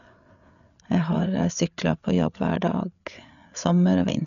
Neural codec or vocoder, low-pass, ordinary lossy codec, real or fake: codec, 16 kHz, 8 kbps, FreqCodec, larger model; 7.2 kHz; none; fake